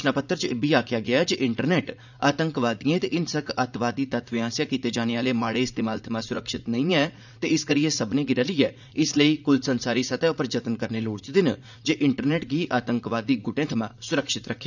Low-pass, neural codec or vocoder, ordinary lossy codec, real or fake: 7.2 kHz; vocoder, 44.1 kHz, 80 mel bands, Vocos; none; fake